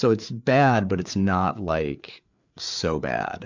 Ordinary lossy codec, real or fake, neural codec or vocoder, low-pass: MP3, 64 kbps; fake; codec, 16 kHz, 4 kbps, FreqCodec, larger model; 7.2 kHz